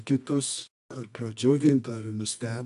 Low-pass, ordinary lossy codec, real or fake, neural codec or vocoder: 10.8 kHz; AAC, 96 kbps; fake; codec, 24 kHz, 0.9 kbps, WavTokenizer, medium music audio release